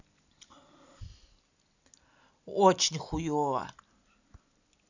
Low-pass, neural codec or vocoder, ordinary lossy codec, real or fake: 7.2 kHz; none; none; real